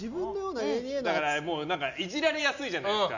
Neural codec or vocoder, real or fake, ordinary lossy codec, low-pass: none; real; none; 7.2 kHz